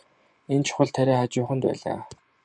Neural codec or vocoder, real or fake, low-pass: vocoder, 24 kHz, 100 mel bands, Vocos; fake; 10.8 kHz